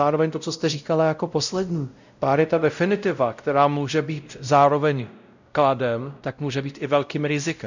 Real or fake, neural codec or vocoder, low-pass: fake; codec, 16 kHz, 0.5 kbps, X-Codec, WavLM features, trained on Multilingual LibriSpeech; 7.2 kHz